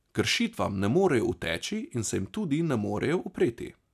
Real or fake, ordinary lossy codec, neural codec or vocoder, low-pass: real; none; none; 14.4 kHz